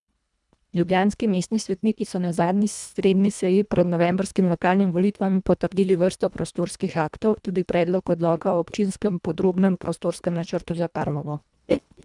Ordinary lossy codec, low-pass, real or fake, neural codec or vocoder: none; 10.8 kHz; fake; codec, 24 kHz, 1.5 kbps, HILCodec